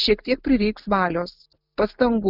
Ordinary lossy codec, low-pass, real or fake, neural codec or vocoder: Opus, 64 kbps; 5.4 kHz; real; none